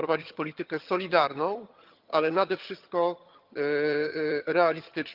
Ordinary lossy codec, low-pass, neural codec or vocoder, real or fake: Opus, 24 kbps; 5.4 kHz; vocoder, 22.05 kHz, 80 mel bands, HiFi-GAN; fake